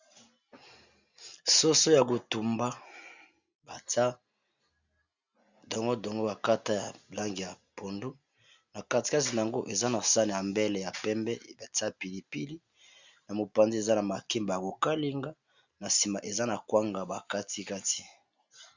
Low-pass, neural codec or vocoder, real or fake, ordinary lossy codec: 7.2 kHz; none; real; Opus, 64 kbps